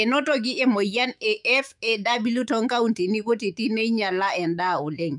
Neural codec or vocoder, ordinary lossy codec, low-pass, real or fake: autoencoder, 48 kHz, 128 numbers a frame, DAC-VAE, trained on Japanese speech; none; 10.8 kHz; fake